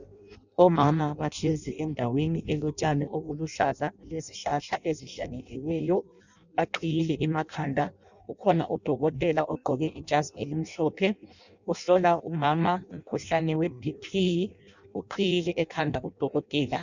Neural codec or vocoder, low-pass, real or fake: codec, 16 kHz in and 24 kHz out, 0.6 kbps, FireRedTTS-2 codec; 7.2 kHz; fake